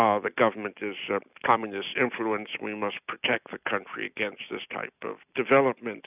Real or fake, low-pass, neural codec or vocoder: real; 3.6 kHz; none